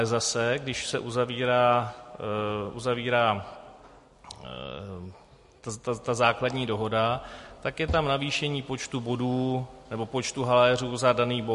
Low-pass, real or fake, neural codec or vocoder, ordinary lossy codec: 14.4 kHz; real; none; MP3, 48 kbps